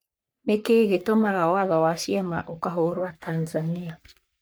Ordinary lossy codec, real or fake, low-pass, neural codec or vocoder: none; fake; none; codec, 44.1 kHz, 3.4 kbps, Pupu-Codec